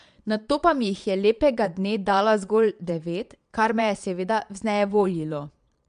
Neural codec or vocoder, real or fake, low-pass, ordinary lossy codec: vocoder, 22.05 kHz, 80 mel bands, Vocos; fake; 9.9 kHz; MP3, 64 kbps